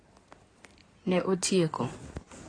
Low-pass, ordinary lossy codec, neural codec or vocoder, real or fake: 9.9 kHz; AAC, 32 kbps; codec, 16 kHz in and 24 kHz out, 2.2 kbps, FireRedTTS-2 codec; fake